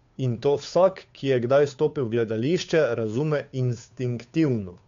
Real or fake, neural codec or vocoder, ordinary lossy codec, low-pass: fake; codec, 16 kHz, 2 kbps, FunCodec, trained on Chinese and English, 25 frames a second; MP3, 64 kbps; 7.2 kHz